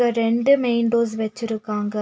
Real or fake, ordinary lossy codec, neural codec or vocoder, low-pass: real; none; none; none